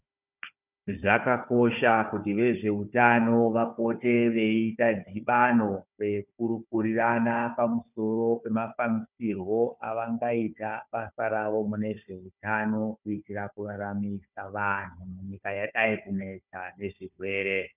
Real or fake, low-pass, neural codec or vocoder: fake; 3.6 kHz; codec, 16 kHz, 4 kbps, FunCodec, trained on Chinese and English, 50 frames a second